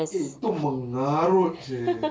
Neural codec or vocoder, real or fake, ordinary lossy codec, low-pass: none; real; none; none